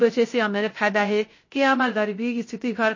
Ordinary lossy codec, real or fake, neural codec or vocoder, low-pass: MP3, 32 kbps; fake; codec, 16 kHz, 0.3 kbps, FocalCodec; 7.2 kHz